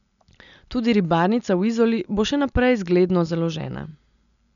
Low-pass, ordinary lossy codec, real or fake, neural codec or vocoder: 7.2 kHz; none; real; none